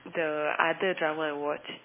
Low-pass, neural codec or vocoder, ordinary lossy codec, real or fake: 3.6 kHz; none; MP3, 16 kbps; real